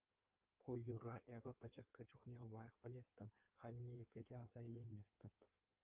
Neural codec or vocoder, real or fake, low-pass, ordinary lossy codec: codec, 16 kHz in and 24 kHz out, 1.1 kbps, FireRedTTS-2 codec; fake; 3.6 kHz; Opus, 24 kbps